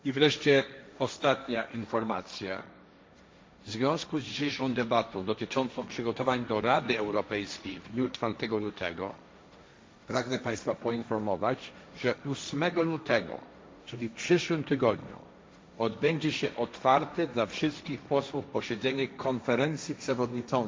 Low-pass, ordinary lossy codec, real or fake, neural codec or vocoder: none; none; fake; codec, 16 kHz, 1.1 kbps, Voila-Tokenizer